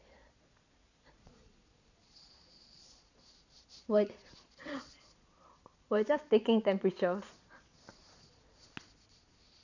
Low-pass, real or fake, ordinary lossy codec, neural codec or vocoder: 7.2 kHz; fake; none; vocoder, 22.05 kHz, 80 mel bands, Vocos